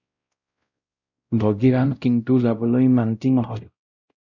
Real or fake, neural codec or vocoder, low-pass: fake; codec, 16 kHz, 0.5 kbps, X-Codec, WavLM features, trained on Multilingual LibriSpeech; 7.2 kHz